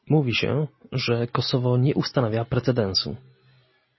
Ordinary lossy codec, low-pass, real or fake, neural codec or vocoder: MP3, 24 kbps; 7.2 kHz; real; none